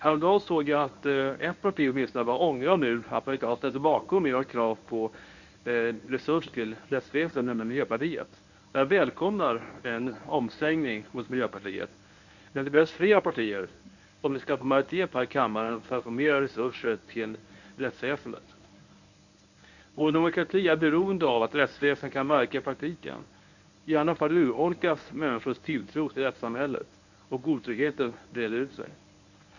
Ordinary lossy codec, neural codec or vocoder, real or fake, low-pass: none; codec, 24 kHz, 0.9 kbps, WavTokenizer, medium speech release version 1; fake; 7.2 kHz